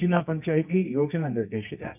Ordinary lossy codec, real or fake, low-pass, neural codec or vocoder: none; fake; 3.6 kHz; codec, 24 kHz, 0.9 kbps, WavTokenizer, medium music audio release